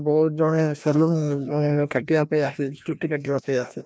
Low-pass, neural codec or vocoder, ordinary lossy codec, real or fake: none; codec, 16 kHz, 1 kbps, FreqCodec, larger model; none; fake